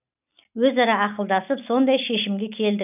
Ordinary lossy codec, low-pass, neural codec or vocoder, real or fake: none; 3.6 kHz; none; real